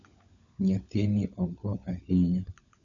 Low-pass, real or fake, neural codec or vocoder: 7.2 kHz; fake; codec, 16 kHz, 16 kbps, FunCodec, trained on LibriTTS, 50 frames a second